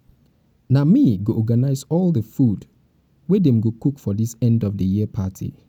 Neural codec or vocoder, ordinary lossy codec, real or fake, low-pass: none; none; real; 19.8 kHz